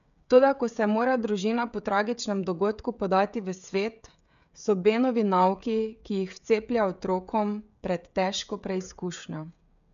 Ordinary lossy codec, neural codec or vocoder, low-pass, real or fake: none; codec, 16 kHz, 16 kbps, FreqCodec, smaller model; 7.2 kHz; fake